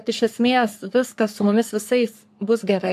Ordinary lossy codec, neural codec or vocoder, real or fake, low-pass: AAC, 96 kbps; codec, 44.1 kHz, 3.4 kbps, Pupu-Codec; fake; 14.4 kHz